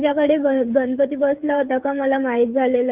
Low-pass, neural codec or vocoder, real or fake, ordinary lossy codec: 3.6 kHz; codec, 16 kHz, 8 kbps, FreqCodec, smaller model; fake; Opus, 24 kbps